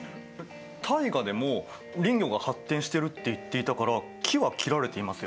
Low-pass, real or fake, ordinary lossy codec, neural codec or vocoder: none; real; none; none